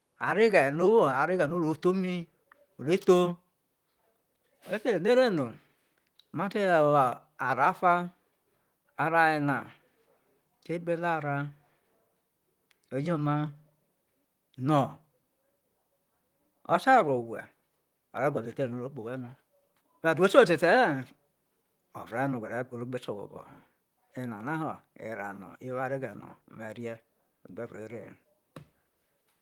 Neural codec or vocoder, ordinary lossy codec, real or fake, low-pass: vocoder, 44.1 kHz, 128 mel bands, Pupu-Vocoder; Opus, 24 kbps; fake; 19.8 kHz